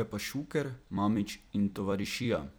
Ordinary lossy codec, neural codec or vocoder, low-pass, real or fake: none; vocoder, 44.1 kHz, 128 mel bands every 256 samples, BigVGAN v2; none; fake